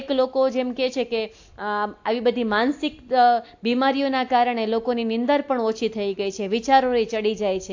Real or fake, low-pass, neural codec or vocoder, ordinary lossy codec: real; 7.2 kHz; none; AAC, 48 kbps